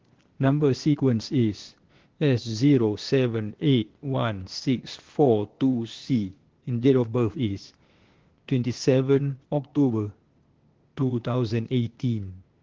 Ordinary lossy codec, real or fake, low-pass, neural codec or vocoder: Opus, 16 kbps; fake; 7.2 kHz; codec, 16 kHz, 0.8 kbps, ZipCodec